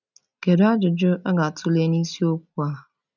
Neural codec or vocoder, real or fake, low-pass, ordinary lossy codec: none; real; 7.2 kHz; none